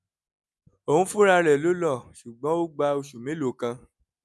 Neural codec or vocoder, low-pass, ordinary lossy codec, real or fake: none; none; none; real